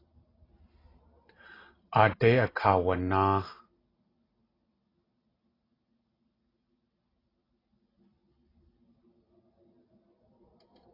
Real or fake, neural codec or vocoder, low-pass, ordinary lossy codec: real; none; 5.4 kHz; AAC, 24 kbps